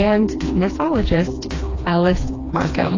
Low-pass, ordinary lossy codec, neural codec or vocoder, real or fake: 7.2 kHz; AAC, 32 kbps; codec, 16 kHz, 2 kbps, FreqCodec, smaller model; fake